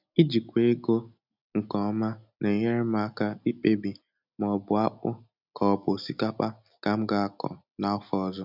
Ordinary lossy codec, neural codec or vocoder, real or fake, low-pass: none; none; real; 5.4 kHz